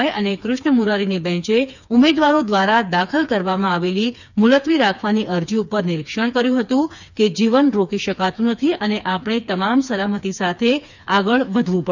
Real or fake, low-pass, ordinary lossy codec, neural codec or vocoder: fake; 7.2 kHz; none; codec, 16 kHz, 4 kbps, FreqCodec, smaller model